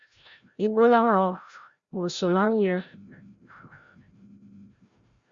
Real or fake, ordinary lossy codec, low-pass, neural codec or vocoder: fake; Opus, 64 kbps; 7.2 kHz; codec, 16 kHz, 0.5 kbps, FreqCodec, larger model